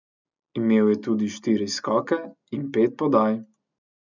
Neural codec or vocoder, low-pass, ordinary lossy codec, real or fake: none; 7.2 kHz; none; real